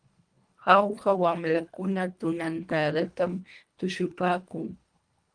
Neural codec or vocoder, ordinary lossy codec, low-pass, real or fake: codec, 24 kHz, 1.5 kbps, HILCodec; Opus, 32 kbps; 9.9 kHz; fake